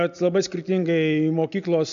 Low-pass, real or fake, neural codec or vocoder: 7.2 kHz; real; none